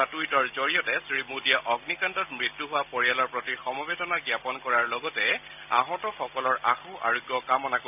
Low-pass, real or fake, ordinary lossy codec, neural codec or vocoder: 3.6 kHz; real; none; none